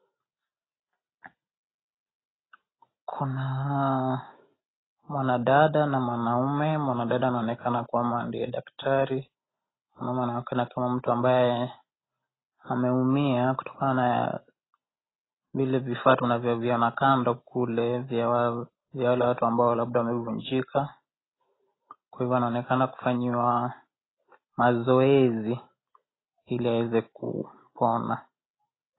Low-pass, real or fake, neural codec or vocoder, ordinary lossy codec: 7.2 kHz; real; none; AAC, 16 kbps